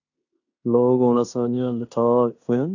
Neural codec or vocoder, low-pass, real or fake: codec, 16 kHz in and 24 kHz out, 0.9 kbps, LongCat-Audio-Codec, fine tuned four codebook decoder; 7.2 kHz; fake